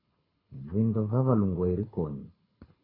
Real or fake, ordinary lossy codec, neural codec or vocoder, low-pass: fake; AAC, 24 kbps; codec, 24 kHz, 6 kbps, HILCodec; 5.4 kHz